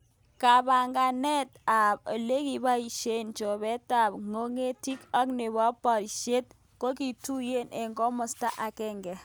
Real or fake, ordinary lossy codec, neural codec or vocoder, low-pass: real; none; none; none